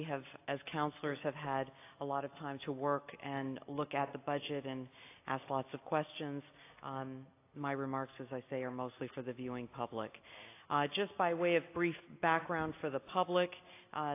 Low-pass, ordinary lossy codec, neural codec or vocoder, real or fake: 3.6 kHz; AAC, 24 kbps; none; real